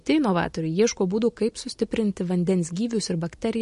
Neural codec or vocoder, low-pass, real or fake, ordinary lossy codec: none; 14.4 kHz; real; MP3, 48 kbps